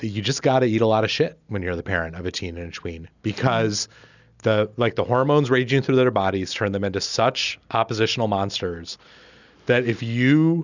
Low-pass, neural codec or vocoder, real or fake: 7.2 kHz; none; real